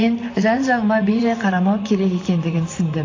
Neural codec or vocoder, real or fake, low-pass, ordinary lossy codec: vocoder, 44.1 kHz, 128 mel bands, Pupu-Vocoder; fake; 7.2 kHz; AAC, 32 kbps